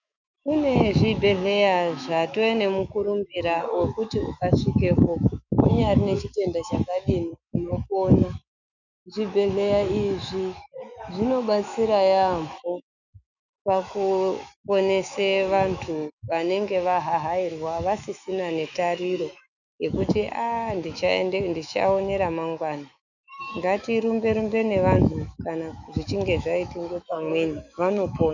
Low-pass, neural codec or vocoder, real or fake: 7.2 kHz; autoencoder, 48 kHz, 128 numbers a frame, DAC-VAE, trained on Japanese speech; fake